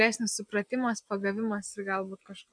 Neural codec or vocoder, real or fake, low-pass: none; real; 9.9 kHz